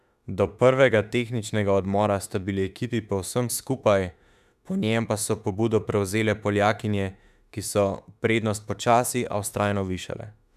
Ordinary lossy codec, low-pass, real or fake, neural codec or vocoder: none; 14.4 kHz; fake; autoencoder, 48 kHz, 32 numbers a frame, DAC-VAE, trained on Japanese speech